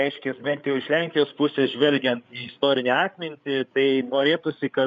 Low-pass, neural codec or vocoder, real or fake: 7.2 kHz; codec, 16 kHz, 8 kbps, FreqCodec, larger model; fake